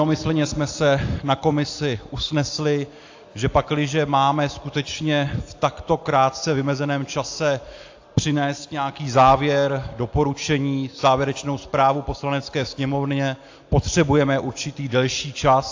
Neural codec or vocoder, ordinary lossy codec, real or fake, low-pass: none; AAC, 48 kbps; real; 7.2 kHz